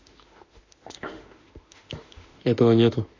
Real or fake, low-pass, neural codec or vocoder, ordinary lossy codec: fake; 7.2 kHz; autoencoder, 48 kHz, 32 numbers a frame, DAC-VAE, trained on Japanese speech; AAC, 48 kbps